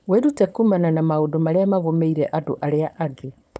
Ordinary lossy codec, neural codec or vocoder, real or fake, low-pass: none; codec, 16 kHz, 4.8 kbps, FACodec; fake; none